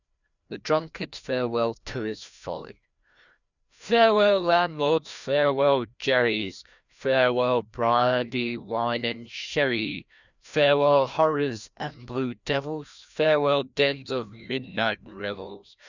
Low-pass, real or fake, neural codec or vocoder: 7.2 kHz; fake; codec, 16 kHz, 1 kbps, FreqCodec, larger model